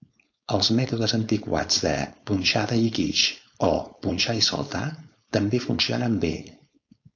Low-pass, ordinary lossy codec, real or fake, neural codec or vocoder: 7.2 kHz; AAC, 48 kbps; fake; codec, 16 kHz, 4.8 kbps, FACodec